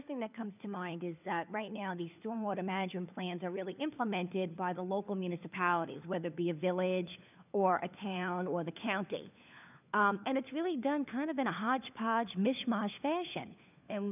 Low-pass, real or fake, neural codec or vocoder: 3.6 kHz; fake; codec, 16 kHz, 4 kbps, FunCodec, trained on LibriTTS, 50 frames a second